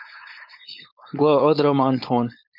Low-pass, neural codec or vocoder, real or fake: 5.4 kHz; codec, 16 kHz, 4.8 kbps, FACodec; fake